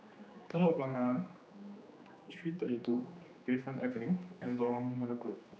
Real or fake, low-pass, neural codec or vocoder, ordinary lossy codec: fake; none; codec, 16 kHz, 2 kbps, X-Codec, HuBERT features, trained on general audio; none